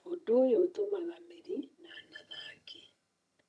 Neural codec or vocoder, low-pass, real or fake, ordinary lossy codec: vocoder, 22.05 kHz, 80 mel bands, HiFi-GAN; none; fake; none